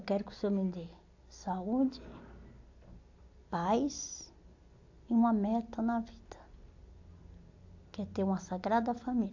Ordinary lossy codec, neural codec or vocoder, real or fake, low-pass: AAC, 48 kbps; none; real; 7.2 kHz